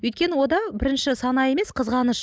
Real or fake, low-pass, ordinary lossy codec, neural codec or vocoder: real; none; none; none